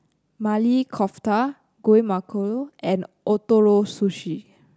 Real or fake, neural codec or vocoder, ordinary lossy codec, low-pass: real; none; none; none